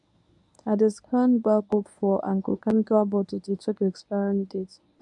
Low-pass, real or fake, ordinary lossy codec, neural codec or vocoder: 10.8 kHz; fake; none; codec, 24 kHz, 0.9 kbps, WavTokenizer, medium speech release version 1